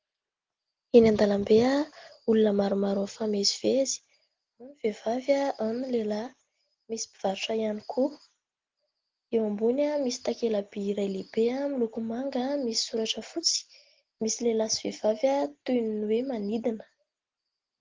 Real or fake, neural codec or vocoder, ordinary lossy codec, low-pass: real; none; Opus, 16 kbps; 7.2 kHz